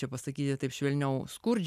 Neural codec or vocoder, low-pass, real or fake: none; 14.4 kHz; real